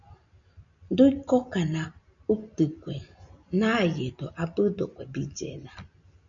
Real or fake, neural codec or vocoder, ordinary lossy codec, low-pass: real; none; MP3, 96 kbps; 7.2 kHz